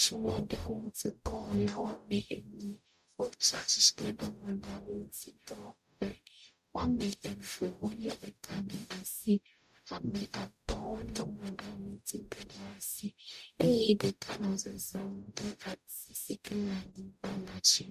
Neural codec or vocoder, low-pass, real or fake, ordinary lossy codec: codec, 44.1 kHz, 0.9 kbps, DAC; 14.4 kHz; fake; MP3, 96 kbps